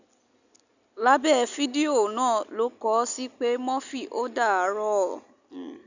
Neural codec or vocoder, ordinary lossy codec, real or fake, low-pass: none; none; real; 7.2 kHz